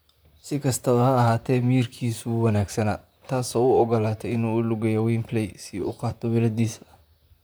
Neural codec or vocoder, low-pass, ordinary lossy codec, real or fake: vocoder, 44.1 kHz, 128 mel bands, Pupu-Vocoder; none; none; fake